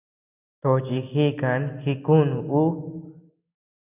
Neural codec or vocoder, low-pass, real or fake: none; 3.6 kHz; real